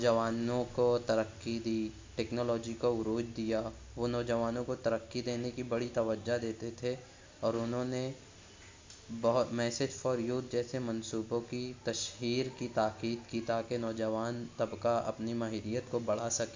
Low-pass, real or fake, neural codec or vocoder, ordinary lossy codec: 7.2 kHz; real; none; MP3, 48 kbps